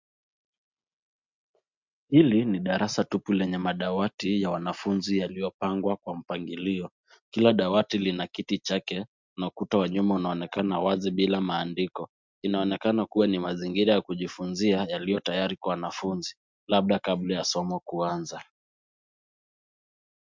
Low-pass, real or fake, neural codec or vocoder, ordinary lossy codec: 7.2 kHz; real; none; MP3, 64 kbps